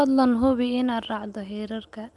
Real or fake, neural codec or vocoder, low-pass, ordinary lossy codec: real; none; 10.8 kHz; Opus, 32 kbps